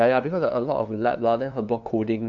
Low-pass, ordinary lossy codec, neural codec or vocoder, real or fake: 7.2 kHz; none; codec, 16 kHz, 2 kbps, FunCodec, trained on LibriTTS, 25 frames a second; fake